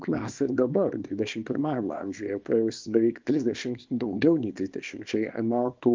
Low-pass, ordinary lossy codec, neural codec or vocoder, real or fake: 7.2 kHz; Opus, 32 kbps; codec, 24 kHz, 0.9 kbps, WavTokenizer, small release; fake